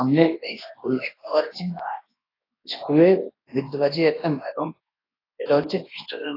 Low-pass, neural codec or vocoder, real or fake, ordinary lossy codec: 5.4 kHz; codec, 24 kHz, 0.9 kbps, WavTokenizer, large speech release; fake; AAC, 24 kbps